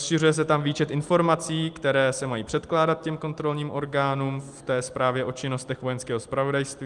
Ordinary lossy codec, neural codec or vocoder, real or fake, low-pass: Opus, 32 kbps; none; real; 10.8 kHz